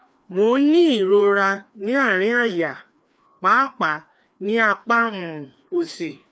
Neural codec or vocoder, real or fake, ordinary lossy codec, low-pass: codec, 16 kHz, 2 kbps, FreqCodec, larger model; fake; none; none